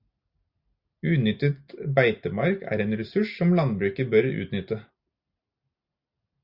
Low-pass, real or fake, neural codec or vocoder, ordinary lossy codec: 5.4 kHz; real; none; Opus, 64 kbps